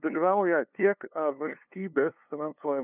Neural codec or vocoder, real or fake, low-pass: codec, 16 kHz, 2 kbps, FunCodec, trained on LibriTTS, 25 frames a second; fake; 3.6 kHz